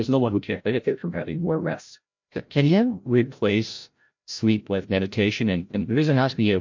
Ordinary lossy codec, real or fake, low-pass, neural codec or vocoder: MP3, 48 kbps; fake; 7.2 kHz; codec, 16 kHz, 0.5 kbps, FreqCodec, larger model